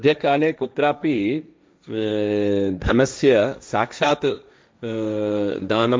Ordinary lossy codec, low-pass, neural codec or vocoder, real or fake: none; none; codec, 16 kHz, 1.1 kbps, Voila-Tokenizer; fake